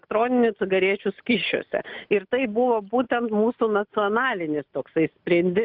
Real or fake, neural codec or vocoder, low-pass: real; none; 5.4 kHz